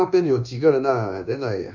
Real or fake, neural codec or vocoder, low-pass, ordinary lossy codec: fake; codec, 24 kHz, 1.2 kbps, DualCodec; 7.2 kHz; none